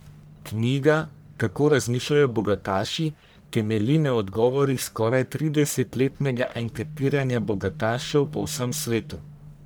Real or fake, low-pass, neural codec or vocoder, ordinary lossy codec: fake; none; codec, 44.1 kHz, 1.7 kbps, Pupu-Codec; none